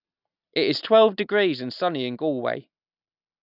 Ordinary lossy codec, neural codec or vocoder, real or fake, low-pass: none; none; real; 5.4 kHz